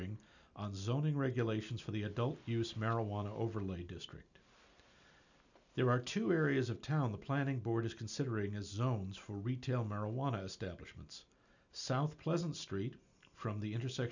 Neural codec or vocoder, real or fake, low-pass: none; real; 7.2 kHz